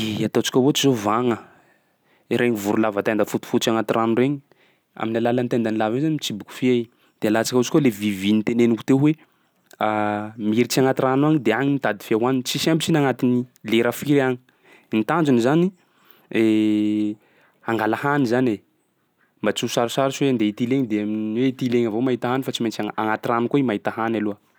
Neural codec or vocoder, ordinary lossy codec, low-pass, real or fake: none; none; none; real